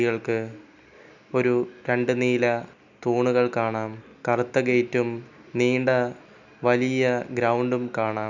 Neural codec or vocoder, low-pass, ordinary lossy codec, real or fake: none; 7.2 kHz; none; real